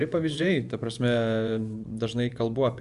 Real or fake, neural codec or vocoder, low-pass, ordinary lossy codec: fake; vocoder, 24 kHz, 100 mel bands, Vocos; 10.8 kHz; Opus, 64 kbps